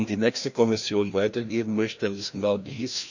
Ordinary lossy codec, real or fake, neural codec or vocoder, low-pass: none; fake; codec, 16 kHz, 1 kbps, FreqCodec, larger model; 7.2 kHz